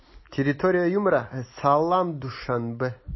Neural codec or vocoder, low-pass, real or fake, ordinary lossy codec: none; 7.2 kHz; real; MP3, 24 kbps